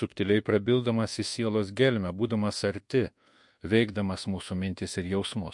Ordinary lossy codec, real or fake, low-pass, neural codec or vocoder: MP3, 48 kbps; fake; 10.8 kHz; codec, 24 kHz, 1.2 kbps, DualCodec